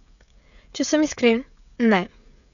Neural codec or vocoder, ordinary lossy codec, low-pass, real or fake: codec, 16 kHz, 16 kbps, FreqCodec, smaller model; none; 7.2 kHz; fake